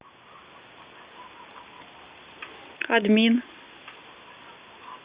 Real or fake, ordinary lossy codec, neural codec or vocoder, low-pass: real; Opus, 64 kbps; none; 3.6 kHz